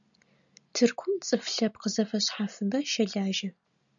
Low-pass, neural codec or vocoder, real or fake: 7.2 kHz; none; real